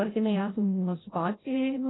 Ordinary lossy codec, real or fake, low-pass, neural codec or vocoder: AAC, 16 kbps; fake; 7.2 kHz; codec, 16 kHz, 0.5 kbps, FreqCodec, larger model